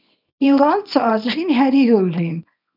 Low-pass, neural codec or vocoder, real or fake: 5.4 kHz; codec, 24 kHz, 0.9 kbps, WavTokenizer, small release; fake